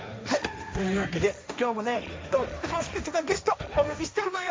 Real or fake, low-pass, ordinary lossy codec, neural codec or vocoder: fake; none; none; codec, 16 kHz, 1.1 kbps, Voila-Tokenizer